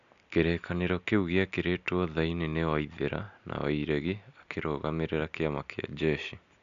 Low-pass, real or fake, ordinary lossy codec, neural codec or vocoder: 7.2 kHz; real; none; none